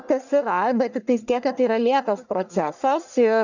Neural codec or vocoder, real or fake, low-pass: codec, 44.1 kHz, 1.7 kbps, Pupu-Codec; fake; 7.2 kHz